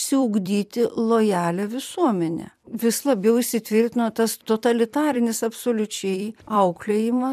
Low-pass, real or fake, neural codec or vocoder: 14.4 kHz; fake; vocoder, 44.1 kHz, 128 mel bands every 256 samples, BigVGAN v2